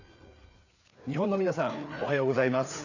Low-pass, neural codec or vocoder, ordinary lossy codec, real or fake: 7.2 kHz; codec, 16 kHz, 4 kbps, FreqCodec, larger model; none; fake